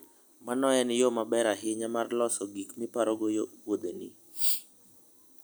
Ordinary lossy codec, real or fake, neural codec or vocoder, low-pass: none; real; none; none